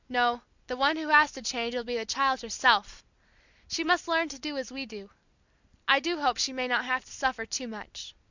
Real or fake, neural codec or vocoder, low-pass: real; none; 7.2 kHz